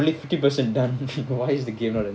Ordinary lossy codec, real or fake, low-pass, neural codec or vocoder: none; real; none; none